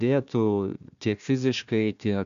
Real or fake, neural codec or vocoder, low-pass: fake; codec, 16 kHz, 1 kbps, FunCodec, trained on Chinese and English, 50 frames a second; 7.2 kHz